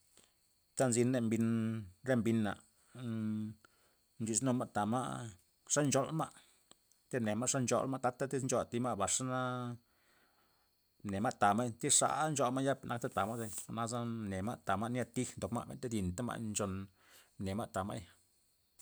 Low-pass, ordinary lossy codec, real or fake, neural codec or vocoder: none; none; real; none